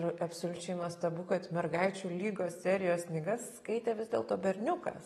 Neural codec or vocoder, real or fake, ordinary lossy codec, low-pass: none; real; AAC, 32 kbps; 19.8 kHz